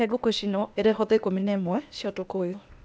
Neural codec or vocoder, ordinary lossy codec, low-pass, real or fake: codec, 16 kHz, 0.8 kbps, ZipCodec; none; none; fake